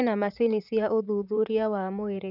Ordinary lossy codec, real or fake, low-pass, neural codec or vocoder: none; fake; 5.4 kHz; vocoder, 44.1 kHz, 128 mel bands, Pupu-Vocoder